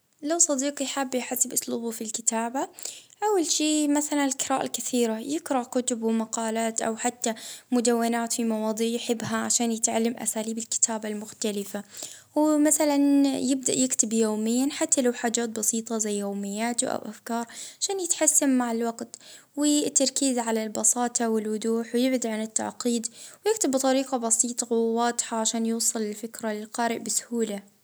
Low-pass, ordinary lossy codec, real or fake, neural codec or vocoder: none; none; real; none